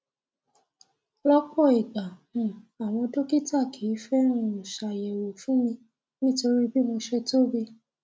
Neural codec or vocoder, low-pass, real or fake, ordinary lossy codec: none; none; real; none